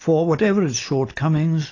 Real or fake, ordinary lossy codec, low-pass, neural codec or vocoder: real; AAC, 32 kbps; 7.2 kHz; none